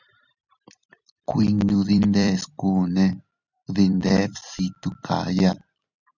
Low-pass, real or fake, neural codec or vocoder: 7.2 kHz; fake; vocoder, 44.1 kHz, 128 mel bands every 256 samples, BigVGAN v2